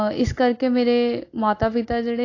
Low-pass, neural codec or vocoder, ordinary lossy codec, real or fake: 7.2 kHz; none; AAC, 32 kbps; real